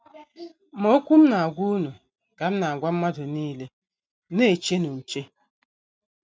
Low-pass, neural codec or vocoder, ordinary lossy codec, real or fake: none; none; none; real